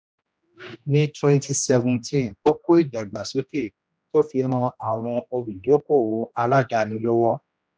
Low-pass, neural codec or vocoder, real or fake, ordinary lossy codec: none; codec, 16 kHz, 1 kbps, X-Codec, HuBERT features, trained on general audio; fake; none